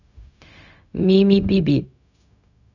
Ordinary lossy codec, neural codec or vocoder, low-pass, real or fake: none; codec, 16 kHz, 0.4 kbps, LongCat-Audio-Codec; 7.2 kHz; fake